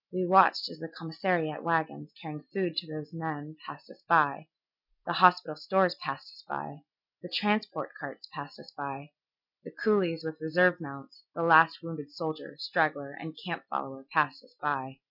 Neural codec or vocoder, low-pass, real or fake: none; 5.4 kHz; real